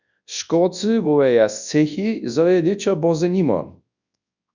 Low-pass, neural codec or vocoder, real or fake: 7.2 kHz; codec, 24 kHz, 0.9 kbps, WavTokenizer, large speech release; fake